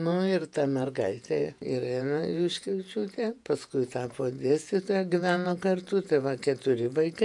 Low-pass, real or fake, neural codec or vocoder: 10.8 kHz; fake; vocoder, 48 kHz, 128 mel bands, Vocos